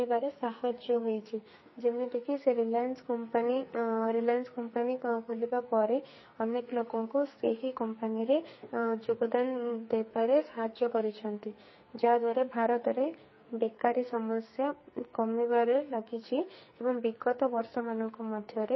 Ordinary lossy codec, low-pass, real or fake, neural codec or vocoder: MP3, 24 kbps; 7.2 kHz; fake; codec, 44.1 kHz, 2.6 kbps, SNAC